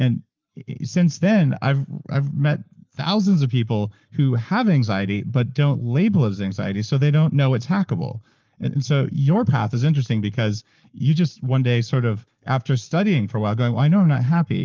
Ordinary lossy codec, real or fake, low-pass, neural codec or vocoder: Opus, 32 kbps; fake; 7.2 kHz; codec, 44.1 kHz, 7.8 kbps, Pupu-Codec